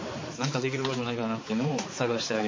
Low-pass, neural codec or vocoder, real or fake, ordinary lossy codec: 7.2 kHz; codec, 16 kHz, 4 kbps, X-Codec, HuBERT features, trained on balanced general audio; fake; MP3, 32 kbps